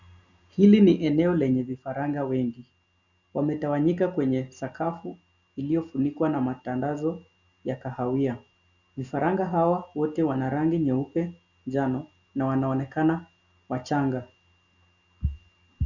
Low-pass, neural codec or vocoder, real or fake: 7.2 kHz; none; real